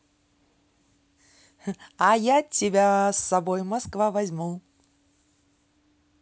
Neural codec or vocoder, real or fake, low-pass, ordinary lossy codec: none; real; none; none